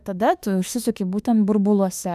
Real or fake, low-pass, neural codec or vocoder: fake; 14.4 kHz; autoencoder, 48 kHz, 32 numbers a frame, DAC-VAE, trained on Japanese speech